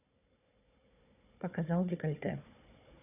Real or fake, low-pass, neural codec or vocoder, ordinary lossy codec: fake; 3.6 kHz; codec, 16 kHz, 4 kbps, FunCodec, trained on Chinese and English, 50 frames a second; none